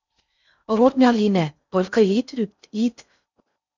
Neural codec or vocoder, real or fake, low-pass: codec, 16 kHz in and 24 kHz out, 0.6 kbps, FocalCodec, streaming, 4096 codes; fake; 7.2 kHz